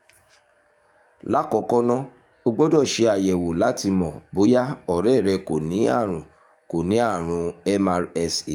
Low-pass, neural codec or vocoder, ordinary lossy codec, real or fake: 19.8 kHz; codec, 44.1 kHz, 7.8 kbps, DAC; none; fake